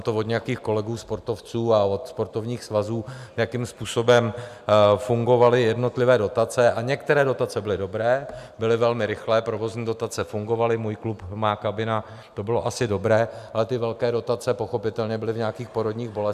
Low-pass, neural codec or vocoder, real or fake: 14.4 kHz; none; real